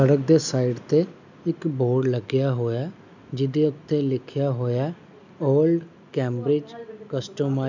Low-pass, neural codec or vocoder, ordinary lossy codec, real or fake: 7.2 kHz; none; none; real